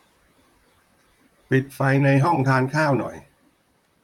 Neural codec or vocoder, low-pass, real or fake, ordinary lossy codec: vocoder, 44.1 kHz, 128 mel bands, Pupu-Vocoder; 19.8 kHz; fake; none